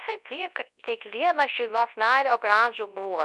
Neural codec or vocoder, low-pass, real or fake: codec, 24 kHz, 0.9 kbps, WavTokenizer, large speech release; 10.8 kHz; fake